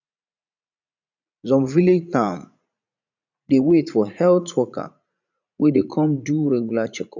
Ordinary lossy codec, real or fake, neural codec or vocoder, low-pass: none; fake; vocoder, 44.1 kHz, 80 mel bands, Vocos; 7.2 kHz